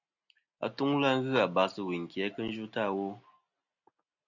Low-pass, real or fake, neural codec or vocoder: 7.2 kHz; real; none